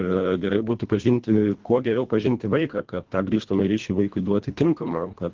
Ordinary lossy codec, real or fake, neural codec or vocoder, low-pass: Opus, 16 kbps; fake; codec, 24 kHz, 1.5 kbps, HILCodec; 7.2 kHz